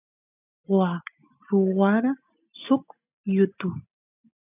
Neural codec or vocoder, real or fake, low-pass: none; real; 3.6 kHz